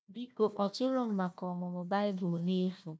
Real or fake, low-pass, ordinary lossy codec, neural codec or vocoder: fake; none; none; codec, 16 kHz, 1 kbps, FunCodec, trained on Chinese and English, 50 frames a second